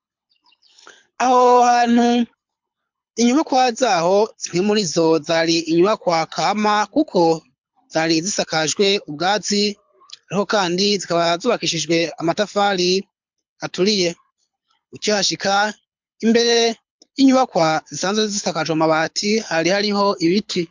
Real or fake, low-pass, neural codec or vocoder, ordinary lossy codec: fake; 7.2 kHz; codec, 24 kHz, 6 kbps, HILCodec; MP3, 64 kbps